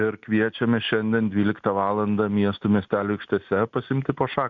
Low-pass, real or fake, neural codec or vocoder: 7.2 kHz; real; none